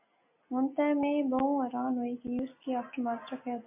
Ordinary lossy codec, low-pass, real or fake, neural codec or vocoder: MP3, 32 kbps; 3.6 kHz; real; none